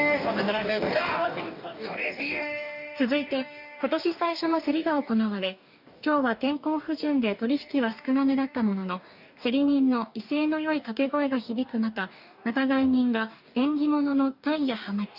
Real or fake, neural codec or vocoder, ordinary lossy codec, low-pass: fake; codec, 44.1 kHz, 2.6 kbps, DAC; none; 5.4 kHz